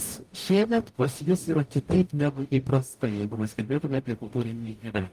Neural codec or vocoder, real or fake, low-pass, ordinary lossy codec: codec, 44.1 kHz, 0.9 kbps, DAC; fake; 14.4 kHz; Opus, 32 kbps